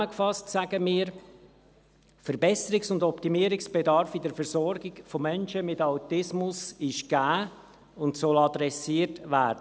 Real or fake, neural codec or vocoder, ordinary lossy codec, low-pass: real; none; none; none